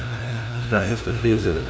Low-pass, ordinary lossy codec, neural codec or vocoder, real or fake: none; none; codec, 16 kHz, 0.5 kbps, FunCodec, trained on LibriTTS, 25 frames a second; fake